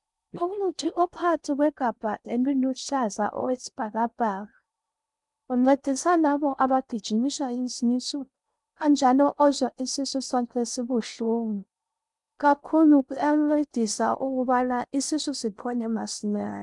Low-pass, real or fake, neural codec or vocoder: 10.8 kHz; fake; codec, 16 kHz in and 24 kHz out, 0.6 kbps, FocalCodec, streaming, 4096 codes